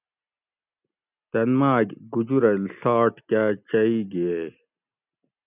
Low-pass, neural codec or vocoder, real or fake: 3.6 kHz; none; real